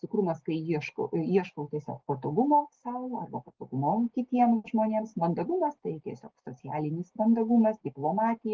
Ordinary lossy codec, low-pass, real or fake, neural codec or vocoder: Opus, 16 kbps; 7.2 kHz; real; none